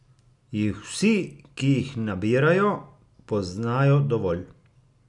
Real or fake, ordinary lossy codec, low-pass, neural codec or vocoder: real; none; 10.8 kHz; none